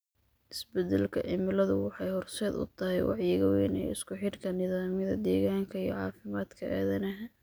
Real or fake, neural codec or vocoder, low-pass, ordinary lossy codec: real; none; none; none